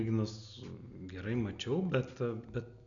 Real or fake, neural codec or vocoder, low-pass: real; none; 7.2 kHz